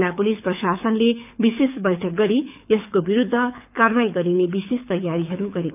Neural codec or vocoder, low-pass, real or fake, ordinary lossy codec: codec, 24 kHz, 6 kbps, HILCodec; 3.6 kHz; fake; none